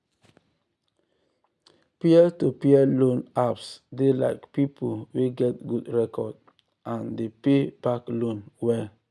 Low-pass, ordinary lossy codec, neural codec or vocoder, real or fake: none; none; none; real